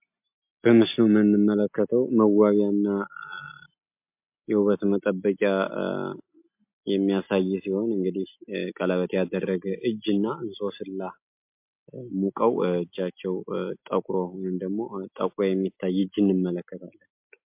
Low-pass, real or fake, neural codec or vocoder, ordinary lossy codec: 3.6 kHz; real; none; MP3, 32 kbps